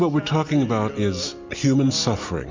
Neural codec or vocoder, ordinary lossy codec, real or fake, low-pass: none; MP3, 64 kbps; real; 7.2 kHz